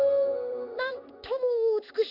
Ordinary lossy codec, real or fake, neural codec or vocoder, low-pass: none; fake; codec, 16 kHz in and 24 kHz out, 1 kbps, XY-Tokenizer; 5.4 kHz